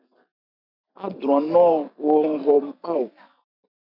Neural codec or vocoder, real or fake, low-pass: vocoder, 44.1 kHz, 128 mel bands every 512 samples, BigVGAN v2; fake; 5.4 kHz